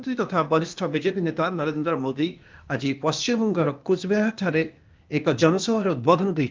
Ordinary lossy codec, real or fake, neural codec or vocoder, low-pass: Opus, 24 kbps; fake; codec, 16 kHz, 0.8 kbps, ZipCodec; 7.2 kHz